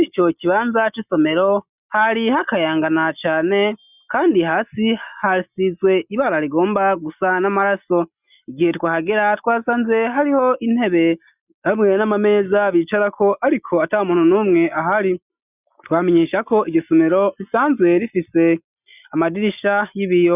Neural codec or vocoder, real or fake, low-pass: none; real; 3.6 kHz